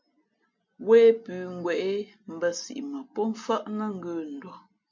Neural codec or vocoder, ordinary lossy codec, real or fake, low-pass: none; MP3, 48 kbps; real; 7.2 kHz